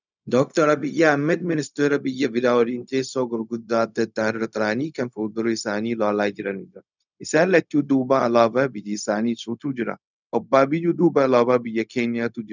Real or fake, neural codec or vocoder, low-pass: fake; codec, 16 kHz, 0.4 kbps, LongCat-Audio-Codec; 7.2 kHz